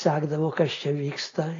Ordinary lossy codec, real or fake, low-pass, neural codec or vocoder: AAC, 32 kbps; real; 7.2 kHz; none